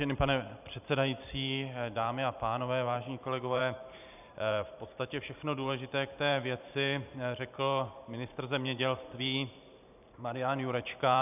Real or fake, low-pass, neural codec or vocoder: fake; 3.6 kHz; vocoder, 44.1 kHz, 128 mel bands every 512 samples, BigVGAN v2